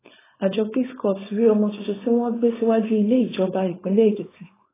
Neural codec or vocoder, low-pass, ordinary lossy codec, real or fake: codec, 16 kHz, 4.8 kbps, FACodec; 3.6 kHz; AAC, 16 kbps; fake